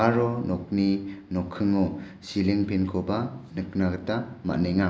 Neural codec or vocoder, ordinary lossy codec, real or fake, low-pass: none; none; real; none